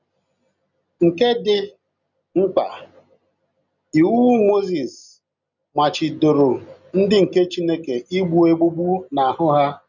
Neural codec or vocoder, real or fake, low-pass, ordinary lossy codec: none; real; 7.2 kHz; none